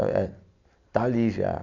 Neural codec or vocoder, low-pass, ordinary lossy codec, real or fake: none; 7.2 kHz; none; real